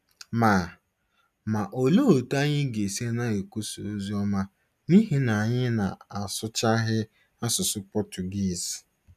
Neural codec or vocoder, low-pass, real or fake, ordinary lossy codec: none; 14.4 kHz; real; none